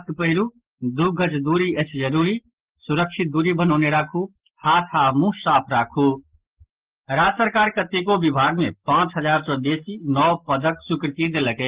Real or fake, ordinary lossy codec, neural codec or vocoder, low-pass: real; Opus, 16 kbps; none; 3.6 kHz